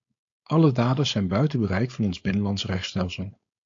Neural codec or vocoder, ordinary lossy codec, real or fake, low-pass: codec, 16 kHz, 4.8 kbps, FACodec; AAC, 48 kbps; fake; 7.2 kHz